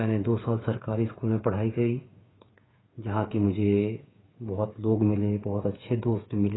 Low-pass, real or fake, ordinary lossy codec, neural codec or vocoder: 7.2 kHz; fake; AAC, 16 kbps; codec, 16 kHz, 8 kbps, FreqCodec, smaller model